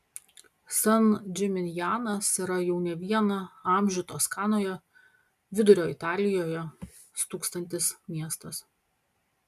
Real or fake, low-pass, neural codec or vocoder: real; 14.4 kHz; none